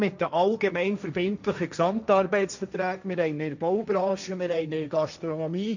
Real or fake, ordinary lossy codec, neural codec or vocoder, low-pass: fake; none; codec, 16 kHz, 1.1 kbps, Voila-Tokenizer; 7.2 kHz